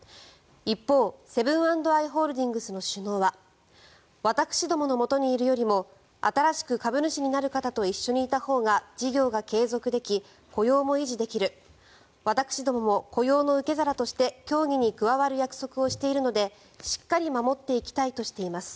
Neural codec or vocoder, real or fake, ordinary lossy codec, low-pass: none; real; none; none